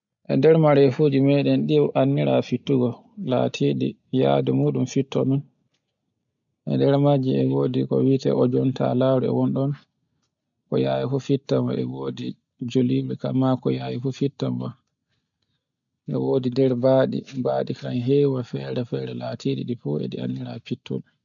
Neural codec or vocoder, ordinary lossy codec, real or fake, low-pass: none; none; real; 7.2 kHz